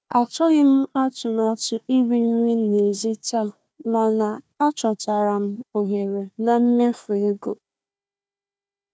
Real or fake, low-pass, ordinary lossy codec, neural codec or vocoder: fake; none; none; codec, 16 kHz, 1 kbps, FunCodec, trained on Chinese and English, 50 frames a second